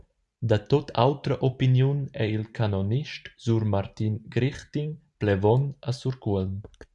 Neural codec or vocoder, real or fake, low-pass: vocoder, 44.1 kHz, 128 mel bands every 512 samples, BigVGAN v2; fake; 10.8 kHz